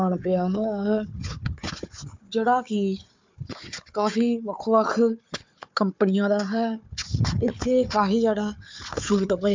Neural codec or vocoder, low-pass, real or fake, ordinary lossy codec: codec, 16 kHz, 4 kbps, FunCodec, trained on Chinese and English, 50 frames a second; 7.2 kHz; fake; MP3, 64 kbps